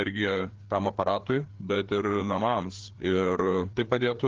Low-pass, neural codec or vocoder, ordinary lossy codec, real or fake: 7.2 kHz; codec, 16 kHz, 4 kbps, FreqCodec, larger model; Opus, 16 kbps; fake